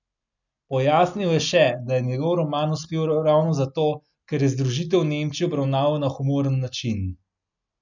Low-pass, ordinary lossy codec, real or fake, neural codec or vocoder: 7.2 kHz; none; real; none